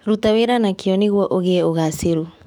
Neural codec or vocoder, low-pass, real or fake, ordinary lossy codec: autoencoder, 48 kHz, 128 numbers a frame, DAC-VAE, trained on Japanese speech; 19.8 kHz; fake; none